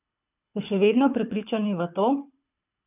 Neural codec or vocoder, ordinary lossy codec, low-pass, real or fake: codec, 24 kHz, 6 kbps, HILCodec; none; 3.6 kHz; fake